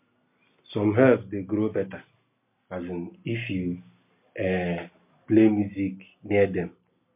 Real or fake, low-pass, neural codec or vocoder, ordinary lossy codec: real; 3.6 kHz; none; MP3, 32 kbps